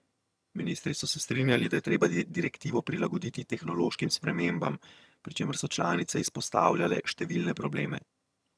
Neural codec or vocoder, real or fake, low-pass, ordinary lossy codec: vocoder, 22.05 kHz, 80 mel bands, HiFi-GAN; fake; none; none